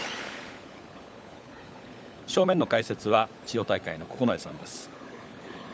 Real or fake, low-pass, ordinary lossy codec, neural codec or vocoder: fake; none; none; codec, 16 kHz, 16 kbps, FunCodec, trained on LibriTTS, 50 frames a second